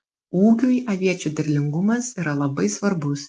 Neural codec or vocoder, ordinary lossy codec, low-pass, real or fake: none; Opus, 24 kbps; 10.8 kHz; real